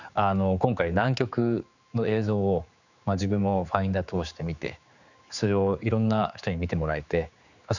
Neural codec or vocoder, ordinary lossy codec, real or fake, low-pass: codec, 16 kHz, 4 kbps, X-Codec, HuBERT features, trained on general audio; none; fake; 7.2 kHz